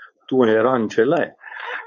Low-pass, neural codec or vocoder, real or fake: 7.2 kHz; codec, 16 kHz, 4.8 kbps, FACodec; fake